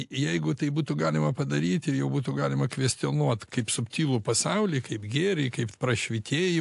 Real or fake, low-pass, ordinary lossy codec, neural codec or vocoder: real; 10.8 kHz; AAC, 64 kbps; none